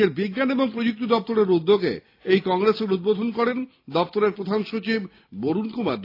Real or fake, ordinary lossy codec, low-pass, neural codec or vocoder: real; AAC, 32 kbps; 5.4 kHz; none